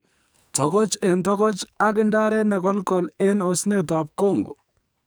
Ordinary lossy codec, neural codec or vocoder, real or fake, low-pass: none; codec, 44.1 kHz, 2.6 kbps, SNAC; fake; none